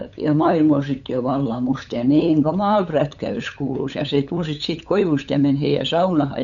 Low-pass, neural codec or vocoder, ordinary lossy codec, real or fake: 7.2 kHz; codec, 16 kHz, 8 kbps, FunCodec, trained on LibriTTS, 25 frames a second; none; fake